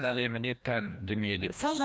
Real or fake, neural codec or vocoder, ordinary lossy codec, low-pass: fake; codec, 16 kHz, 1 kbps, FreqCodec, larger model; none; none